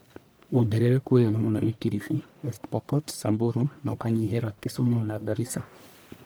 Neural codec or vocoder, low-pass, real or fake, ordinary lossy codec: codec, 44.1 kHz, 1.7 kbps, Pupu-Codec; none; fake; none